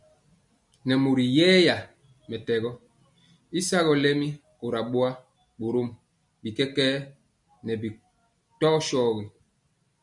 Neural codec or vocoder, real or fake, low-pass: none; real; 10.8 kHz